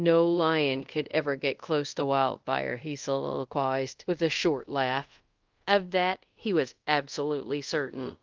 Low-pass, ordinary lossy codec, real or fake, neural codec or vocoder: 7.2 kHz; Opus, 24 kbps; fake; codec, 24 kHz, 0.5 kbps, DualCodec